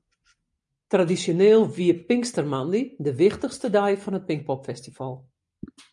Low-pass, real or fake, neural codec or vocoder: 10.8 kHz; real; none